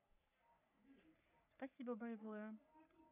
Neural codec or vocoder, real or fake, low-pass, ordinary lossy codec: codec, 44.1 kHz, 3.4 kbps, Pupu-Codec; fake; 3.6 kHz; none